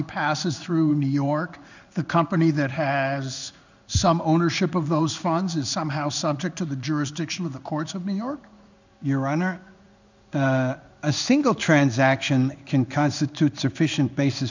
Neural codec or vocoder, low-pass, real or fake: codec, 16 kHz in and 24 kHz out, 1 kbps, XY-Tokenizer; 7.2 kHz; fake